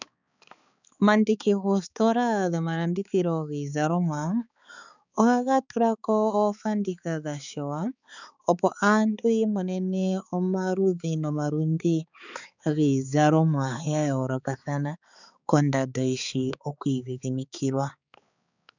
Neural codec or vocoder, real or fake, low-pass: codec, 16 kHz, 4 kbps, X-Codec, HuBERT features, trained on balanced general audio; fake; 7.2 kHz